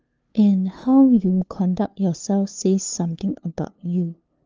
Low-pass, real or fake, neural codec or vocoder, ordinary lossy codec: 7.2 kHz; fake; codec, 16 kHz, 2 kbps, FunCodec, trained on LibriTTS, 25 frames a second; Opus, 24 kbps